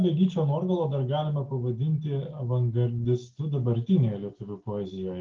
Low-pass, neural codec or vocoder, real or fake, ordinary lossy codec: 7.2 kHz; none; real; Opus, 32 kbps